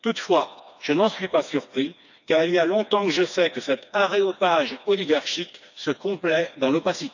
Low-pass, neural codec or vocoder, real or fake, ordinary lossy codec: 7.2 kHz; codec, 16 kHz, 2 kbps, FreqCodec, smaller model; fake; none